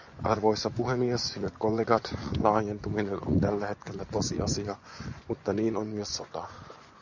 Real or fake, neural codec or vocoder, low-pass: fake; vocoder, 24 kHz, 100 mel bands, Vocos; 7.2 kHz